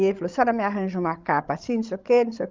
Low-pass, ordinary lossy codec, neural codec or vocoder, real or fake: 7.2 kHz; Opus, 32 kbps; codec, 16 kHz, 16 kbps, FreqCodec, larger model; fake